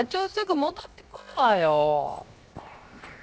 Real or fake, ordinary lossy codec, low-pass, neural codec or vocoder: fake; none; none; codec, 16 kHz, 0.7 kbps, FocalCodec